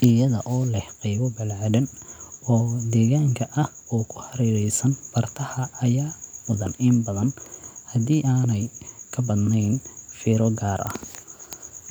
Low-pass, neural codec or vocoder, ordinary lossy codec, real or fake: none; none; none; real